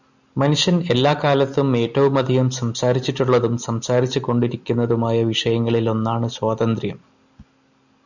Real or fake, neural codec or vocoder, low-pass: real; none; 7.2 kHz